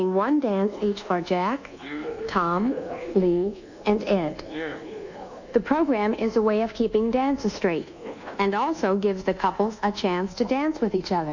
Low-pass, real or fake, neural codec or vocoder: 7.2 kHz; fake; codec, 24 kHz, 1.2 kbps, DualCodec